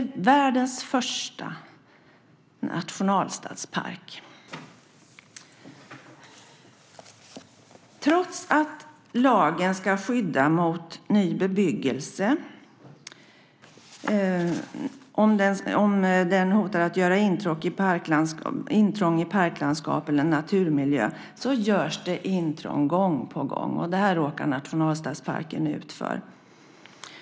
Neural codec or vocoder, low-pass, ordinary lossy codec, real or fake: none; none; none; real